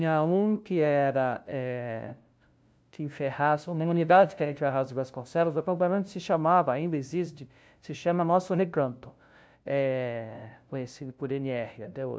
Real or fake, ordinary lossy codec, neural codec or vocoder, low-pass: fake; none; codec, 16 kHz, 0.5 kbps, FunCodec, trained on LibriTTS, 25 frames a second; none